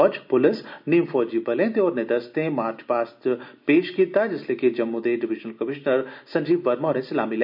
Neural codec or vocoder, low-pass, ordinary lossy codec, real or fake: none; 5.4 kHz; none; real